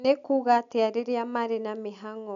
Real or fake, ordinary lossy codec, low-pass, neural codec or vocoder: real; none; 7.2 kHz; none